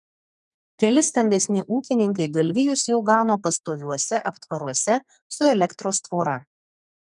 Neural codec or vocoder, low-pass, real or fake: codec, 44.1 kHz, 2.6 kbps, SNAC; 10.8 kHz; fake